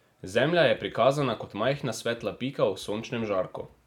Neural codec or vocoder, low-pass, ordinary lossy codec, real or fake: none; 19.8 kHz; none; real